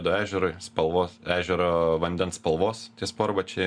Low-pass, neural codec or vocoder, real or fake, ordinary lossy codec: 9.9 kHz; none; real; MP3, 96 kbps